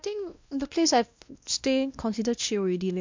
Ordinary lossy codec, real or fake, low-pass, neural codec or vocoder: MP3, 64 kbps; fake; 7.2 kHz; codec, 16 kHz, 1 kbps, X-Codec, WavLM features, trained on Multilingual LibriSpeech